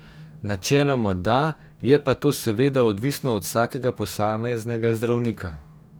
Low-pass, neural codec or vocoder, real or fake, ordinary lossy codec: none; codec, 44.1 kHz, 2.6 kbps, DAC; fake; none